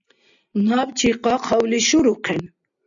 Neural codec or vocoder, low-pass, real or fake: none; 7.2 kHz; real